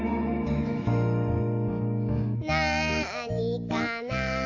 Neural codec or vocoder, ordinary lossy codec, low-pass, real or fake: autoencoder, 48 kHz, 128 numbers a frame, DAC-VAE, trained on Japanese speech; AAC, 48 kbps; 7.2 kHz; fake